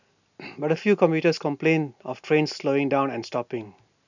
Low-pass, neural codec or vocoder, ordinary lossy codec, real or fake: 7.2 kHz; none; none; real